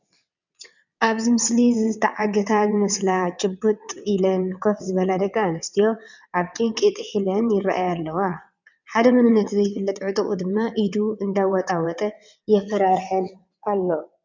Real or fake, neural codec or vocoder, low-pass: fake; vocoder, 22.05 kHz, 80 mel bands, WaveNeXt; 7.2 kHz